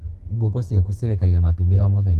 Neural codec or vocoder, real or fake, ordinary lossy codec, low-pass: codec, 32 kHz, 1.9 kbps, SNAC; fake; Opus, 24 kbps; 14.4 kHz